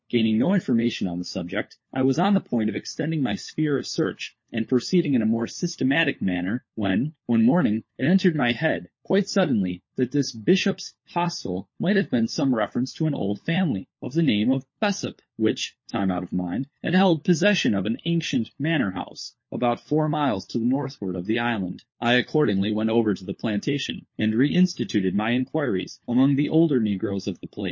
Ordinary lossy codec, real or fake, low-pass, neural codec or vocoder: MP3, 32 kbps; fake; 7.2 kHz; codec, 16 kHz, 4 kbps, FunCodec, trained on LibriTTS, 50 frames a second